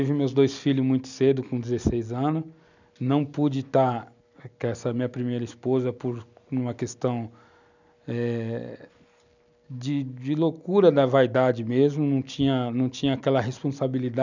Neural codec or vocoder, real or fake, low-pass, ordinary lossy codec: none; real; 7.2 kHz; none